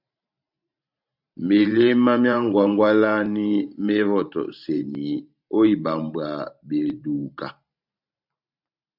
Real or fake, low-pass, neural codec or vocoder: fake; 5.4 kHz; vocoder, 44.1 kHz, 128 mel bands every 512 samples, BigVGAN v2